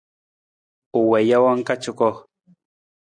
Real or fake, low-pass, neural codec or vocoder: real; 9.9 kHz; none